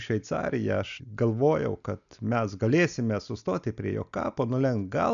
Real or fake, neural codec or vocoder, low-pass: real; none; 7.2 kHz